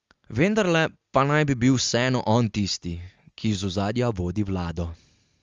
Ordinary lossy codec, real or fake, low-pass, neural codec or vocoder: Opus, 32 kbps; real; 7.2 kHz; none